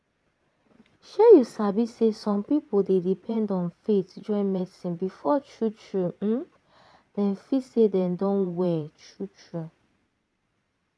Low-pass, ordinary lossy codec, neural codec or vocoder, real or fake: none; none; vocoder, 22.05 kHz, 80 mel bands, Vocos; fake